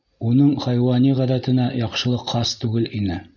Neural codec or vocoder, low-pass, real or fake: none; 7.2 kHz; real